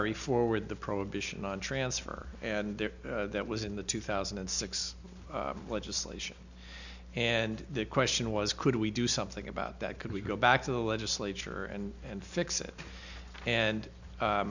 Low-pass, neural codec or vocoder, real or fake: 7.2 kHz; none; real